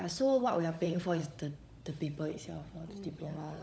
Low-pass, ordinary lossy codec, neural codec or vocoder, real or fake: none; none; codec, 16 kHz, 16 kbps, FunCodec, trained on LibriTTS, 50 frames a second; fake